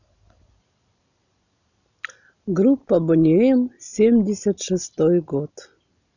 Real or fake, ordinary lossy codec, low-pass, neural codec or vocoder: real; none; 7.2 kHz; none